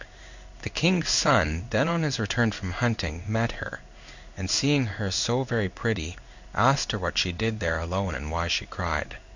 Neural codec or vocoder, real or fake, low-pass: codec, 16 kHz in and 24 kHz out, 1 kbps, XY-Tokenizer; fake; 7.2 kHz